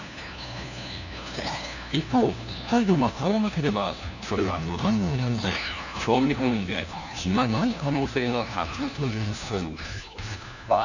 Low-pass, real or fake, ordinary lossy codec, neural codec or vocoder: 7.2 kHz; fake; none; codec, 16 kHz, 1 kbps, FunCodec, trained on LibriTTS, 50 frames a second